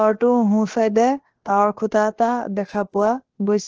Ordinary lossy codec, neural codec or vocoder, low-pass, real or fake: Opus, 16 kbps; codec, 16 kHz, about 1 kbps, DyCAST, with the encoder's durations; 7.2 kHz; fake